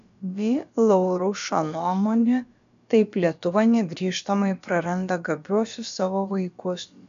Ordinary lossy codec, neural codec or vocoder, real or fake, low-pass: MP3, 64 kbps; codec, 16 kHz, about 1 kbps, DyCAST, with the encoder's durations; fake; 7.2 kHz